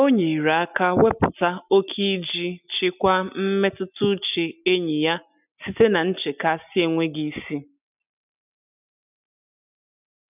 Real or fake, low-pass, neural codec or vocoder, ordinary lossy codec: real; 3.6 kHz; none; none